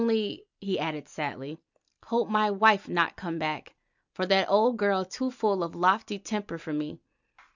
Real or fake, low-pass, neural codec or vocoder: real; 7.2 kHz; none